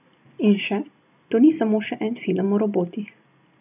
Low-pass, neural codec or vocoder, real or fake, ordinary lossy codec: 3.6 kHz; none; real; none